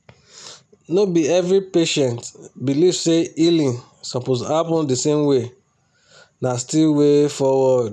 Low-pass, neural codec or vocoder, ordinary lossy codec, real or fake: none; none; none; real